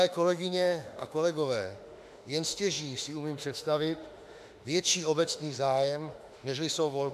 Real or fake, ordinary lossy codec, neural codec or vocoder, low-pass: fake; AAC, 96 kbps; autoencoder, 48 kHz, 32 numbers a frame, DAC-VAE, trained on Japanese speech; 14.4 kHz